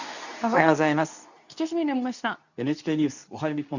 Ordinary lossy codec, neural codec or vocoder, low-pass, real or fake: none; codec, 24 kHz, 0.9 kbps, WavTokenizer, medium speech release version 2; 7.2 kHz; fake